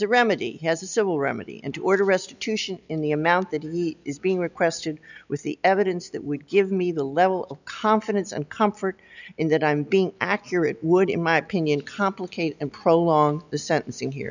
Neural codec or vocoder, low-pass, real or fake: none; 7.2 kHz; real